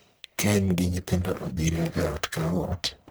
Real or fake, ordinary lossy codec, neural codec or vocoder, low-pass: fake; none; codec, 44.1 kHz, 1.7 kbps, Pupu-Codec; none